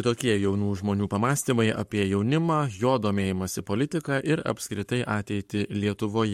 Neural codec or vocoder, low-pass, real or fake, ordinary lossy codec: codec, 44.1 kHz, 7.8 kbps, Pupu-Codec; 19.8 kHz; fake; MP3, 64 kbps